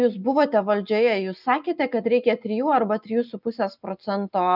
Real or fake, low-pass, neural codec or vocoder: real; 5.4 kHz; none